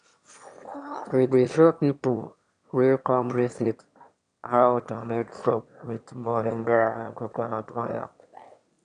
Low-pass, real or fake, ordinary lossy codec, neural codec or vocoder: 9.9 kHz; fake; none; autoencoder, 22.05 kHz, a latent of 192 numbers a frame, VITS, trained on one speaker